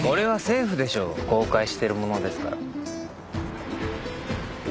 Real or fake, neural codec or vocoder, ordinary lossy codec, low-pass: real; none; none; none